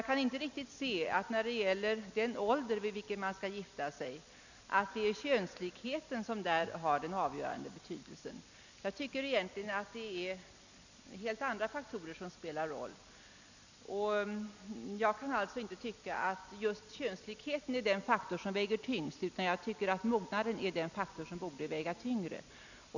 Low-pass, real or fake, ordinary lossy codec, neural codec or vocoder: 7.2 kHz; real; none; none